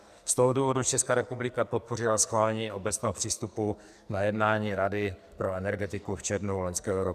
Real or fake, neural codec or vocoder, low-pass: fake; codec, 44.1 kHz, 2.6 kbps, SNAC; 14.4 kHz